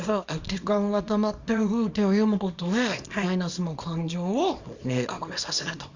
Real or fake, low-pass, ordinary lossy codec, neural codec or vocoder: fake; 7.2 kHz; Opus, 64 kbps; codec, 24 kHz, 0.9 kbps, WavTokenizer, small release